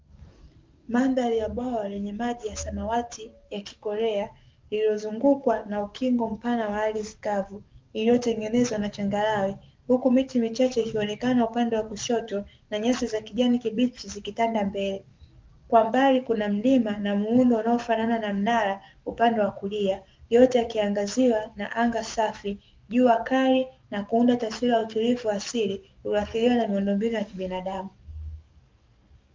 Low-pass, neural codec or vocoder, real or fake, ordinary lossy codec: 7.2 kHz; codec, 44.1 kHz, 7.8 kbps, DAC; fake; Opus, 24 kbps